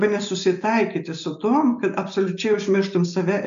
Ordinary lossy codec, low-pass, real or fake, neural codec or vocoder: MP3, 64 kbps; 7.2 kHz; real; none